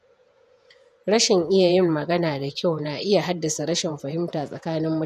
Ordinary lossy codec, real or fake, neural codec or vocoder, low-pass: none; fake; vocoder, 48 kHz, 128 mel bands, Vocos; 14.4 kHz